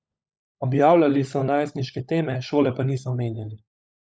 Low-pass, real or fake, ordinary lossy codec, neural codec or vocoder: none; fake; none; codec, 16 kHz, 16 kbps, FunCodec, trained on LibriTTS, 50 frames a second